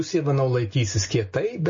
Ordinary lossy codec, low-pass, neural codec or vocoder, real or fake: MP3, 32 kbps; 7.2 kHz; none; real